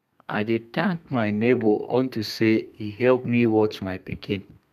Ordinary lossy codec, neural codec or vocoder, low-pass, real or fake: none; codec, 32 kHz, 1.9 kbps, SNAC; 14.4 kHz; fake